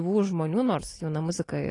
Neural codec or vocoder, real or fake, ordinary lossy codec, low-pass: none; real; AAC, 32 kbps; 10.8 kHz